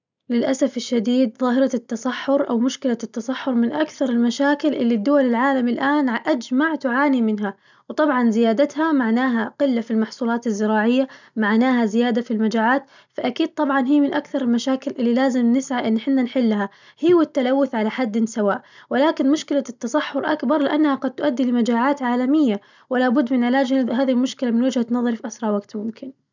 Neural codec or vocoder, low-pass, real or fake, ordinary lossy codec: none; 7.2 kHz; real; none